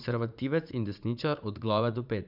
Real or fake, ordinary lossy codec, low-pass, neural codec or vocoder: fake; none; 5.4 kHz; vocoder, 44.1 kHz, 80 mel bands, Vocos